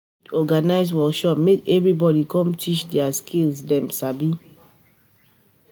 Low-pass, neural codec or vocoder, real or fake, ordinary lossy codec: none; none; real; none